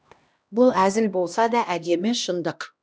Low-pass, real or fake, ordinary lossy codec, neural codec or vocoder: none; fake; none; codec, 16 kHz, 1 kbps, X-Codec, HuBERT features, trained on LibriSpeech